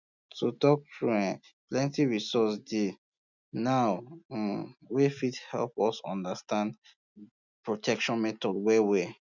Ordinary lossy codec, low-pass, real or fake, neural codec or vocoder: none; 7.2 kHz; real; none